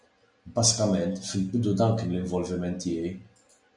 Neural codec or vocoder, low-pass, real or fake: none; 10.8 kHz; real